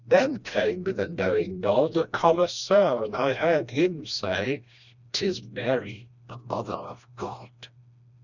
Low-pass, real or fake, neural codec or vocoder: 7.2 kHz; fake; codec, 16 kHz, 1 kbps, FreqCodec, smaller model